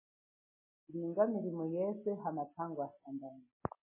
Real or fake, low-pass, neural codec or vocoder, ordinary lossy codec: real; 3.6 kHz; none; MP3, 16 kbps